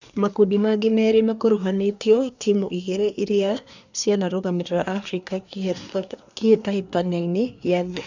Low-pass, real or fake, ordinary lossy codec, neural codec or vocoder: 7.2 kHz; fake; none; codec, 24 kHz, 1 kbps, SNAC